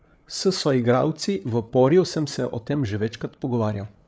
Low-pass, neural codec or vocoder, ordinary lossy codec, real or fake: none; codec, 16 kHz, 8 kbps, FreqCodec, larger model; none; fake